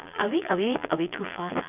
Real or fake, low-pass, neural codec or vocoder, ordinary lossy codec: fake; 3.6 kHz; vocoder, 22.05 kHz, 80 mel bands, Vocos; none